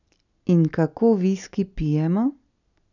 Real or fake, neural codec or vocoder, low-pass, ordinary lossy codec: real; none; 7.2 kHz; none